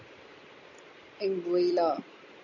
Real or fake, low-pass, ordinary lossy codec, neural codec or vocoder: real; 7.2 kHz; MP3, 48 kbps; none